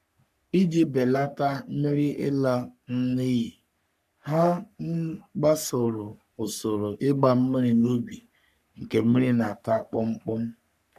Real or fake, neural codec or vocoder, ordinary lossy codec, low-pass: fake; codec, 44.1 kHz, 3.4 kbps, Pupu-Codec; none; 14.4 kHz